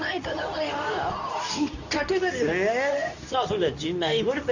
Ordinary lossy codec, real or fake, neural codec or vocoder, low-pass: none; fake; codec, 24 kHz, 0.9 kbps, WavTokenizer, medium speech release version 2; 7.2 kHz